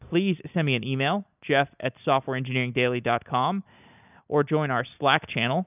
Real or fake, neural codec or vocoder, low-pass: real; none; 3.6 kHz